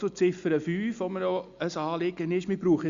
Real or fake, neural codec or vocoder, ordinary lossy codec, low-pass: real; none; Opus, 64 kbps; 7.2 kHz